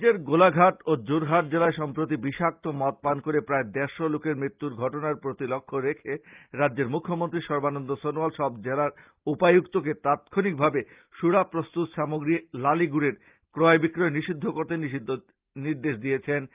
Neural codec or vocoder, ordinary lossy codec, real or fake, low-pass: none; Opus, 24 kbps; real; 3.6 kHz